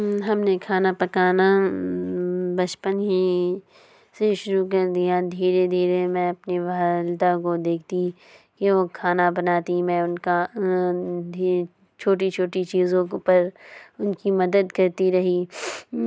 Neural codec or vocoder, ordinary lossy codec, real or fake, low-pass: none; none; real; none